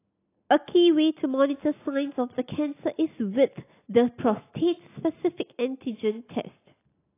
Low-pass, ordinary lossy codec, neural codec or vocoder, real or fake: 3.6 kHz; AAC, 24 kbps; none; real